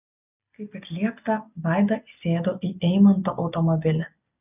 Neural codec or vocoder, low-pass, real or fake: none; 3.6 kHz; real